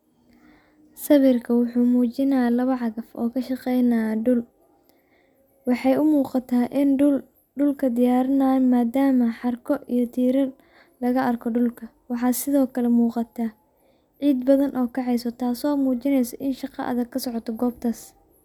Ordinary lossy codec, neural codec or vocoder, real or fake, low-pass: none; none; real; 19.8 kHz